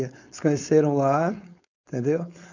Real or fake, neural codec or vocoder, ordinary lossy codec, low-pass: fake; codec, 16 kHz, 4.8 kbps, FACodec; none; 7.2 kHz